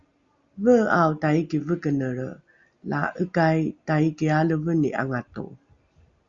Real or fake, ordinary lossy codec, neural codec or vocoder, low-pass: real; Opus, 64 kbps; none; 7.2 kHz